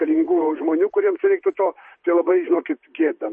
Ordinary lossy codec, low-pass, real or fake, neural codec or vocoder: MP3, 32 kbps; 10.8 kHz; fake; vocoder, 44.1 kHz, 128 mel bands, Pupu-Vocoder